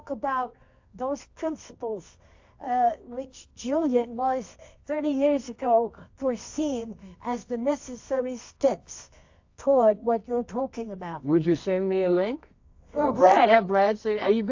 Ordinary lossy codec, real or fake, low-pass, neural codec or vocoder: AAC, 48 kbps; fake; 7.2 kHz; codec, 24 kHz, 0.9 kbps, WavTokenizer, medium music audio release